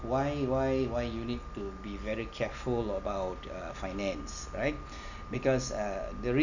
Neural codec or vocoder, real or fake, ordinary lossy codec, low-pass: none; real; none; 7.2 kHz